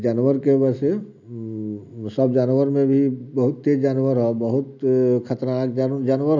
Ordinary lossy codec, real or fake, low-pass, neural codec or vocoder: none; real; 7.2 kHz; none